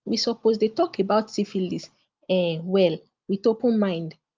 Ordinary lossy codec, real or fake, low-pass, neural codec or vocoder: Opus, 24 kbps; real; 7.2 kHz; none